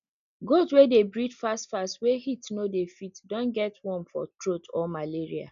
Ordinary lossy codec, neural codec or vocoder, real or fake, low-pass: none; none; real; 7.2 kHz